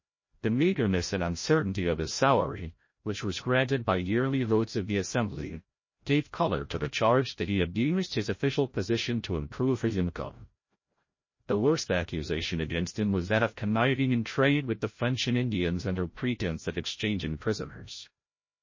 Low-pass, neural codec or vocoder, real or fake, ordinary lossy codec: 7.2 kHz; codec, 16 kHz, 0.5 kbps, FreqCodec, larger model; fake; MP3, 32 kbps